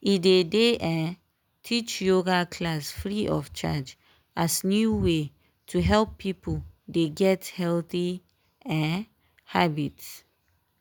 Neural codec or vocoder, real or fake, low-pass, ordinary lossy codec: none; real; none; none